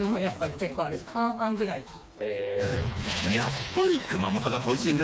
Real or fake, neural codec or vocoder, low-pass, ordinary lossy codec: fake; codec, 16 kHz, 2 kbps, FreqCodec, smaller model; none; none